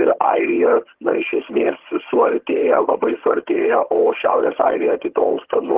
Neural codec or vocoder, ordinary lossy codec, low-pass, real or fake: vocoder, 22.05 kHz, 80 mel bands, HiFi-GAN; Opus, 16 kbps; 3.6 kHz; fake